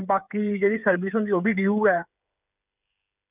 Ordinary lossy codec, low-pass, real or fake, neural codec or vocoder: none; 3.6 kHz; fake; codec, 16 kHz, 8 kbps, FreqCodec, smaller model